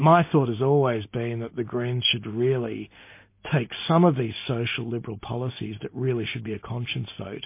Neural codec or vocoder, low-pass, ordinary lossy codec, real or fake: none; 3.6 kHz; MP3, 24 kbps; real